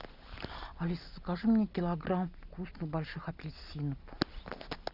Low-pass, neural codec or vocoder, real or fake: 5.4 kHz; none; real